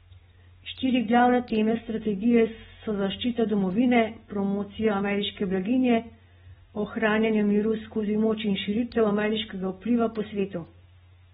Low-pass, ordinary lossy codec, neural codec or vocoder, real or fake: 19.8 kHz; AAC, 16 kbps; none; real